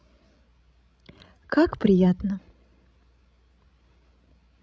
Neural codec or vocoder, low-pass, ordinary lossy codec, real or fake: codec, 16 kHz, 16 kbps, FreqCodec, larger model; none; none; fake